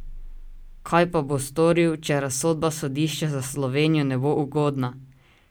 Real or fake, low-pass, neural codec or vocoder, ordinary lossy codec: real; none; none; none